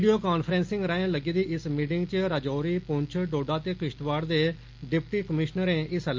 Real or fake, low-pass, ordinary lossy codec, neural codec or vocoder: real; 7.2 kHz; Opus, 24 kbps; none